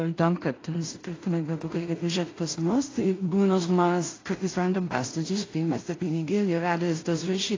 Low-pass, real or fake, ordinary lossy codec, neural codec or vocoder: 7.2 kHz; fake; AAC, 32 kbps; codec, 16 kHz in and 24 kHz out, 0.4 kbps, LongCat-Audio-Codec, two codebook decoder